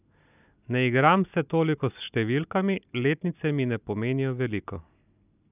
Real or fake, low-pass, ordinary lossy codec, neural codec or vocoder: real; 3.6 kHz; none; none